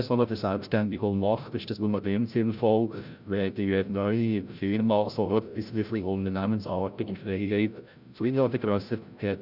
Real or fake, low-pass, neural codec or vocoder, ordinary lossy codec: fake; 5.4 kHz; codec, 16 kHz, 0.5 kbps, FreqCodec, larger model; none